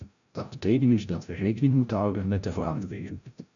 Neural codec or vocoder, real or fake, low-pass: codec, 16 kHz, 0.5 kbps, FreqCodec, larger model; fake; 7.2 kHz